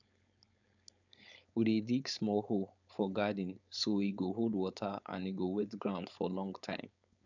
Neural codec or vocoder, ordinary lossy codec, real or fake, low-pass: codec, 16 kHz, 4.8 kbps, FACodec; none; fake; 7.2 kHz